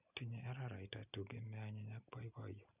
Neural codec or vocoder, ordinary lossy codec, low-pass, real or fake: none; none; 3.6 kHz; real